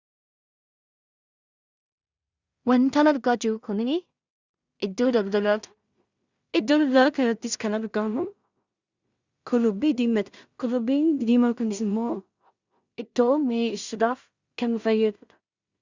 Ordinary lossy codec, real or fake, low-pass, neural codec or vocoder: Opus, 64 kbps; fake; 7.2 kHz; codec, 16 kHz in and 24 kHz out, 0.4 kbps, LongCat-Audio-Codec, two codebook decoder